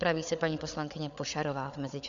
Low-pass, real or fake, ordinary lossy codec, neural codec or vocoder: 7.2 kHz; fake; Opus, 64 kbps; codec, 16 kHz, 8 kbps, FreqCodec, larger model